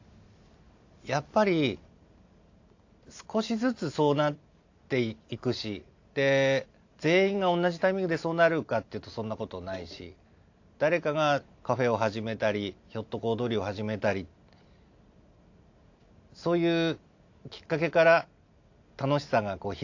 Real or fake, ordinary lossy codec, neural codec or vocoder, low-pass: real; AAC, 48 kbps; none; 7.2 kHz